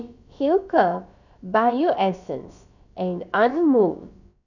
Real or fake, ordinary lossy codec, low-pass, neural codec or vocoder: fake; none; 7.2 kHz; codec, 16 kHz, about 1 kbps, DyCAST, with the encoder's durations